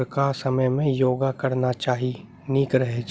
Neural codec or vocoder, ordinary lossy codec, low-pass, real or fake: none; none; none; real